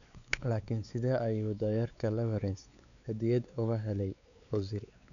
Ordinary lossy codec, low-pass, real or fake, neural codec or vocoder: none; 7.2 kHz; fake; codec, 16 kHz, 4 kbps, X-Codec, WavLM features, trained on Multilingual LibriSpeech